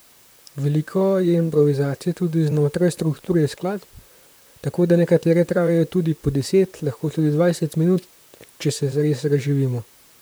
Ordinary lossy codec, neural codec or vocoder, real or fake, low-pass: none; vocoder, 44.1 kHz, 128 mel bands, Pupu-Vocoder; fake; none